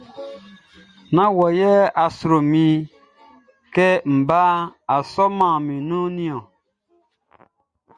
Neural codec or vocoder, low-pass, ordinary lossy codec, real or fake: none; 9.9 kHz; Opus, 64 kbps; real